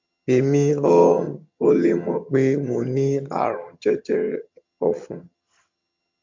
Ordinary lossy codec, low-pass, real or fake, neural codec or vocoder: MP3, 48 kbps; 7.2 kHz; fake; vocoder, 22.05 kHz, 80 mel bands, HiFi-GAN